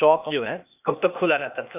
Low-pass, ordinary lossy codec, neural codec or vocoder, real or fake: 3.6 kHz; none; codec, 16 kHz, 0.8 kbps, ZipCodec; fake